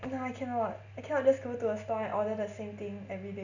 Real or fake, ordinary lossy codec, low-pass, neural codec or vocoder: real; none; 7.2 kHz; none